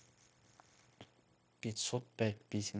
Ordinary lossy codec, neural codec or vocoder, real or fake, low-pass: none; codec, 16 kHz, 0.9 kbps, LongCat-Audio-Codec; fake; none